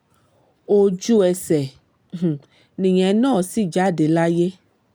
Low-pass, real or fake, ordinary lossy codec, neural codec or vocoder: none; real; none; none